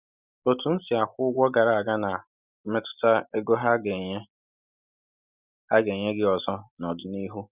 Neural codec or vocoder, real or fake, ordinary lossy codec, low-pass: none; real; Opus, 64 kbps; 3.6 kHz